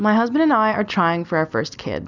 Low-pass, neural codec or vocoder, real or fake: 7.2 kHz; none; real